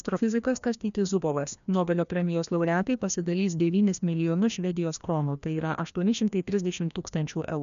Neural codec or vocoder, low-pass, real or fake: codec, 16 kHz, 1 kbps, FreqCodec, larger model; 7.2 kHz; fake